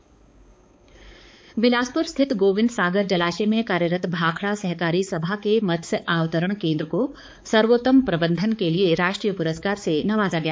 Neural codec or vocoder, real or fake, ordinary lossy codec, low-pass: codec, 16 kHz, 4 kbps, X-Codec, HuBERT features, trained on balanced general audio; fake; none; none